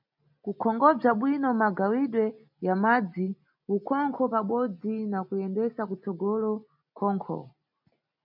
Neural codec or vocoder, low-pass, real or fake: none; 5.4 kHz; real